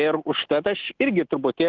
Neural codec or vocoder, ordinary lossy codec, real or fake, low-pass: none; Opus, 16 kbps; real; 7.2 kHz